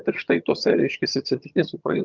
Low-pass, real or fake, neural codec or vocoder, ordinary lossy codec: 7.2 kHz; fake; vocoder, 22.05 kHz, 80 mel bands, HiFi-GAN; Opus, 32 kbps